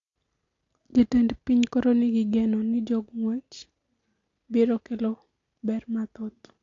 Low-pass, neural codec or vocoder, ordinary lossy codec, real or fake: 7.2 kHz; none; AAC, 48 kbps; real